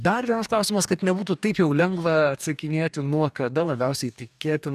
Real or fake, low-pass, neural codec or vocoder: fake; 14.4 kHz; codec, 44.1 kHz, 2.6 kbps, DAC